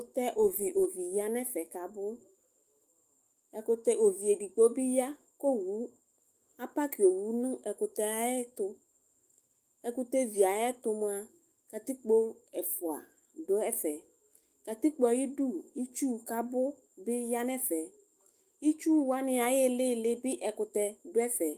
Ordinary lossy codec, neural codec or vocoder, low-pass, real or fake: Opus, 24 kbps; autoencoder, 48 kHz, 128 numbers a frame, DAC-VAE, trained on Japanese speech; 14.4 kHz; fake